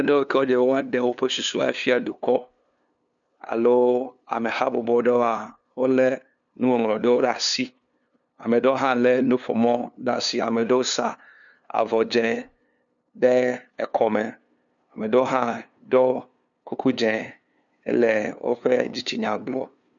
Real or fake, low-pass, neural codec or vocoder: fake; 7.2 kHz; codec, 16 kHz, 2 kbps, FunCodec, trained on LibriTTS, 25 frames a second